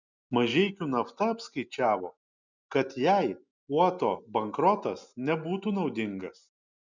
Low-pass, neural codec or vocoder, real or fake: 7.2 kHz; none; real